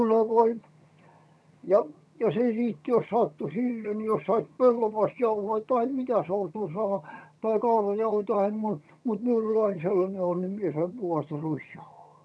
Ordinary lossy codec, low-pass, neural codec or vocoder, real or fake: none; none; vocoder, 22.05 kHz, 80 mel bands, HiFi-GAN; fake